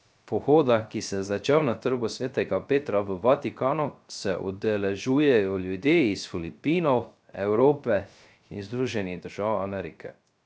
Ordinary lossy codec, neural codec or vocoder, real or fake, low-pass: none; codec, 16 kHz, 0.3 kbps, FocalCodec; fake; none